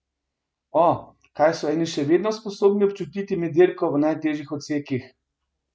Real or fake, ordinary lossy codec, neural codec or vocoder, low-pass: real; none; none; none